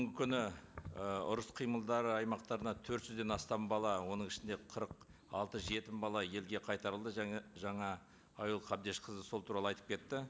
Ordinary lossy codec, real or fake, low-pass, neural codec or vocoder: none; real; none; none